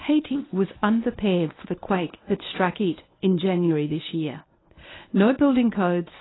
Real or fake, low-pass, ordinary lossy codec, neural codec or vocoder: fake; 7.2 kHz; AAC, 16 kbps; codec, 24 kHz, 0.9 kbps, WavTokenizer, medium speech release version 1